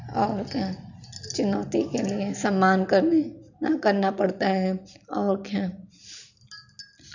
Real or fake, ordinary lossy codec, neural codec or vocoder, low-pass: real; none; none; 7.2 kHz